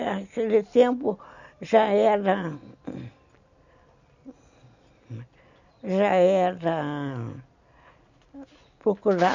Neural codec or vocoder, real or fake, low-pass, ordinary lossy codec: none; real; 7.2 kHz; none